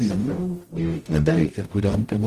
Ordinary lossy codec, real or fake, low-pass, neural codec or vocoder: Opus, 24 kbps; fake; 14.4 kHz; codec, 44.1 kHz, 0.9 kbps, DAC